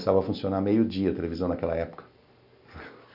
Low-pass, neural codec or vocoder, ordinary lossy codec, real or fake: 5.4 kHz; none; none; real